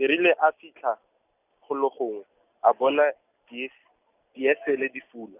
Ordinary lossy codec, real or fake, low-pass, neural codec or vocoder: none; real; 3.6 kHz; none